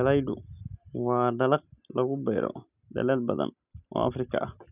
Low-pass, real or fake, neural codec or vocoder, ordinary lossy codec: 3.6 kHz; real; none; none